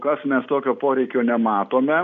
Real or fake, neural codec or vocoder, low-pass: real; none; 7.2 kHz